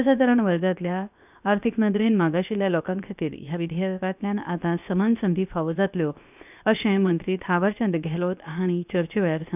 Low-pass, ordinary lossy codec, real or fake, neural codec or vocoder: 3.6 kHz; none; fake; codec, 16 kHz, 0.7 kbps, FocalCodec